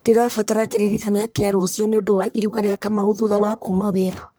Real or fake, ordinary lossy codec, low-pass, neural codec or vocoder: fake; none; none; codec, 44.1 kHz, 1.7 kbps, Pupu-Codec